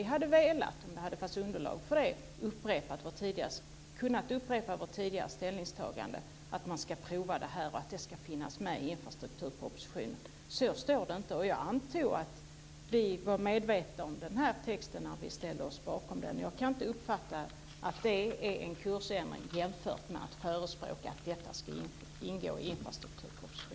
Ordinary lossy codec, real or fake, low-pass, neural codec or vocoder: none; real; none; none